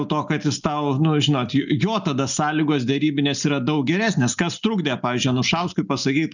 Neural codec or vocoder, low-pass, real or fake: none; 7.2 kHz; real